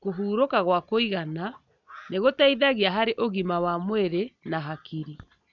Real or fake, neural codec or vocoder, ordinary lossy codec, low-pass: real; none; none; 7.2 kHz